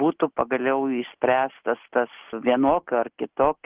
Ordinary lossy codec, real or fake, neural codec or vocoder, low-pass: Opus, 16 kbps; real; none; 3.6 kHz